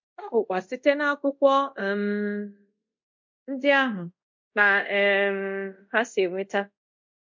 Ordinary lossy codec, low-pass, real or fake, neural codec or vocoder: MP3, 48 kbps; 7.2 kHz; fake; codec, 24 kHz, 0.5 kbps, DualCodec